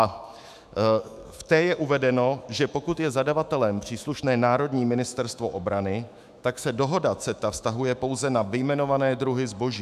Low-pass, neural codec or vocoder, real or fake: 14.4 kHz; autoencoder, 48 kHz, 128 numbers a frame, DAC-VAE, trained on Japanese speech; fake